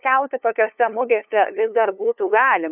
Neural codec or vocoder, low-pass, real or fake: codec, 16 kHz, 2 kbps, FunCodec, trained on LibriTTS, 25 frames a second; 3.6 kHz; fake